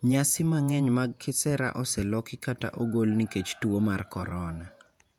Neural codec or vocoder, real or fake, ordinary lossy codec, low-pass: vocoder, 48 kHz, 128 mel bands, Vocos; fake; none; 19.8 kHz